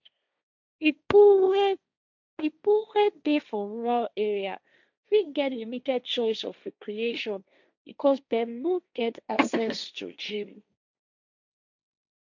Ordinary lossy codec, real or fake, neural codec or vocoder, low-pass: none; fake; codec, 16 kHz, 1.1 kbps, Voila-Tokenizer; 7.2 kHz